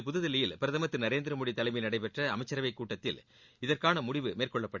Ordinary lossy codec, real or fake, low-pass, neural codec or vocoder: Opus, 64 kbps; real; 7.2 kHz; none